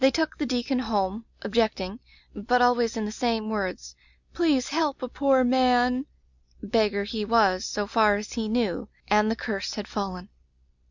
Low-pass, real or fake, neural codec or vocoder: 7.2 kHz; real; none